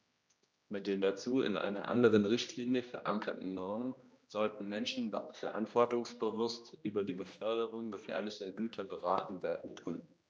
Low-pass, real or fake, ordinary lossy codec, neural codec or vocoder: none; fake; none; codec, 16 kHz, 1 kbps, X-Codec, HuBERT features, trained on general audio